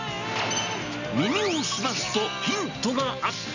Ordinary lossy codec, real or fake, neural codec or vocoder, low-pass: none; real; none; 7.2 kHz